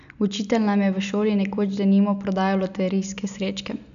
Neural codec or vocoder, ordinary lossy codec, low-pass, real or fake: none; none; 7.2 kHz; real